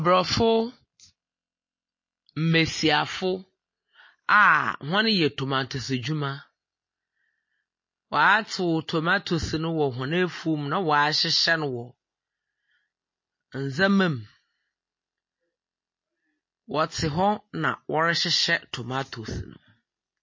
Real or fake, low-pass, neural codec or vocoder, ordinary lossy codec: real; 7.2 kHz; none; MP3, 32 kbps